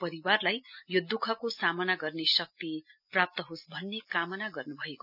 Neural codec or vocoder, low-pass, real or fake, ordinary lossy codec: none; 5.4 kHz; real; none